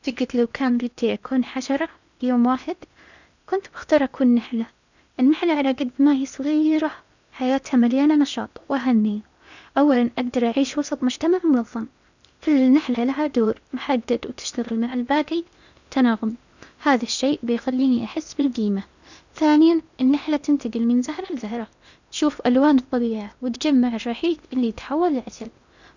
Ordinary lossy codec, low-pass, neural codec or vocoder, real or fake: none; 7.2 kHz; codec, 16 kHz in and 24 kHz out, 0.8 kbps, FocalCodec, streaming, 65536 codes; fake